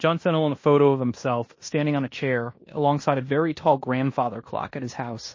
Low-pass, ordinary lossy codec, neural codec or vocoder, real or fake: 7.2 kHz; MP3, 32 kbps; codec, 16 kHz in and 24 kHz out, 0.9 kbps, LongCat-Audio-Codec, fine tuned four codebook decoder; fake